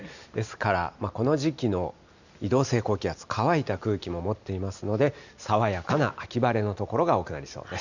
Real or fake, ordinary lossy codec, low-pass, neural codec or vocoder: real; none; 7.2 kHz; none